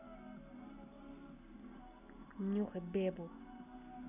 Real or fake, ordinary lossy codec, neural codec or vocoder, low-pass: real; none; none; 3.6 kHz